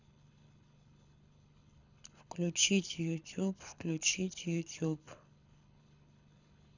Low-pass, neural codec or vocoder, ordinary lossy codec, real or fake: 7.2 kHz; codec, 24 kHz, 6 kbps, HILCodec; none; fake